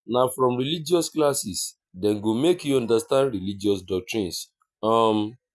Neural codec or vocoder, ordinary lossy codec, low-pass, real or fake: none; none; none; real